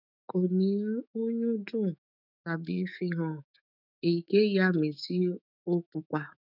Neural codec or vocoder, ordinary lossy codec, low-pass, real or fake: autoencoder, 48 kHz, 128 numbers a frame, DAC-VAE, trained on Japanese speech; none; 5.4 kHz; fake